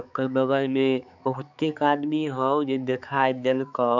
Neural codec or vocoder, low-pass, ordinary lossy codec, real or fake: codec, 16 kHz, 4 kbps, X-Codec, HuBERT features, trained on balanced general audio; 7.2 kHz; none; fake